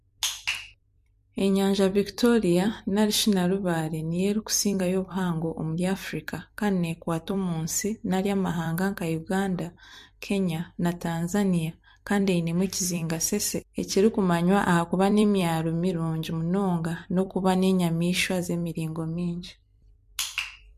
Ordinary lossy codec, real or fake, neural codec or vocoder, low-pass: MP3, 64 kbps; fake; vocoder, 44.1 kHz, 128 mel bands every 256 samples, BigVGAN v2; 14.4 kHz